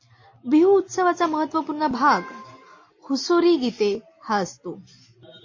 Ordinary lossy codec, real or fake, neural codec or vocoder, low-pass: MP3, 32 kbps; real; none; 7.2 kHz